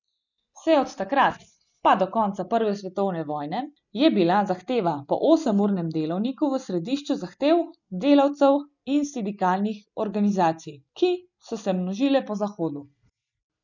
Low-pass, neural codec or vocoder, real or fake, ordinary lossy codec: 7.2 kHz; none; real; none